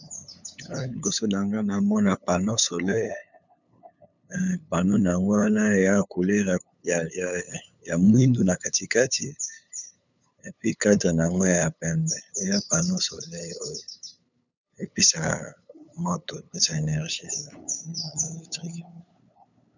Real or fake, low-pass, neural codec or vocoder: fake; 7.2 kHz; codec, 16 kHz, 8 kbps, FunCodec, trained on LibriTTS, 25 frames a second